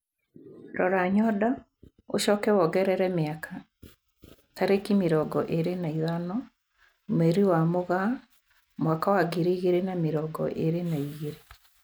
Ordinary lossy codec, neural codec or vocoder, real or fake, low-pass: none; none; real; none